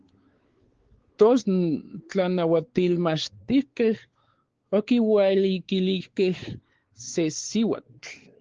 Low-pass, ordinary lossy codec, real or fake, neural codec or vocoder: 7.2 kHz; Opus, 16 kbps; fake; codec, 16 kHz, 4 kbps, X-Codec, WavLM features, trained on Multilingual LibriSpeech